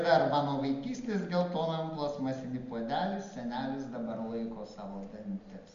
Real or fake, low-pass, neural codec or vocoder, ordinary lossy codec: real; 7.2 kHz; none; MP3, 48 kbps